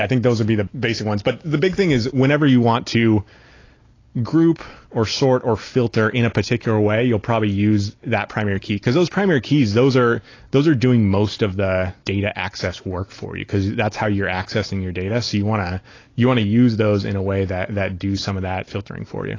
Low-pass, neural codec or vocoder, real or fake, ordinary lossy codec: 7.2 kHz; none; real; AAC, 32 kbps